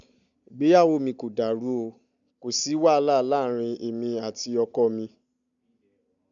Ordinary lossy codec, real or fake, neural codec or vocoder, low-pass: none; real; none; 7.2 kHz